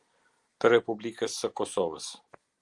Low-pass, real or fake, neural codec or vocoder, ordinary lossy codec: 10.8 kHz; real; none; Opus, 24 kbps